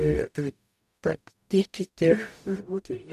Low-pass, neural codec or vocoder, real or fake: 14.4 kHz; codec, 44.1 kHz, 0.9 kbps, DAC; fake